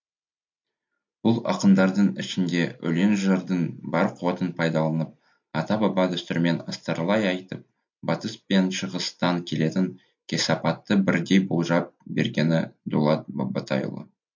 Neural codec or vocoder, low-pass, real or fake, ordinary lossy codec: none; 7.2 kHz; real; MP3, 48 kbps